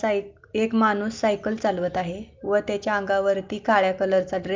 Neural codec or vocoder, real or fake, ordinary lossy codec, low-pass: none; real; Opus, 24 kbps; 7.2 kHz